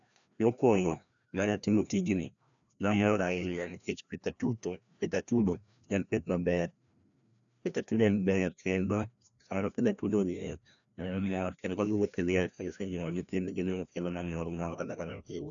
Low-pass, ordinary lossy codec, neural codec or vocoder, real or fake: 7.2 kHz; none; codec, 16 kHz, 1 kbps, FreqCodec, larger model; fake